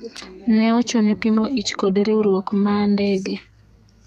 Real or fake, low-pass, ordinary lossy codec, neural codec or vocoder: fake; 14.4 kHz; none; codec, 32 kHz, 1.9 kbps, SNAC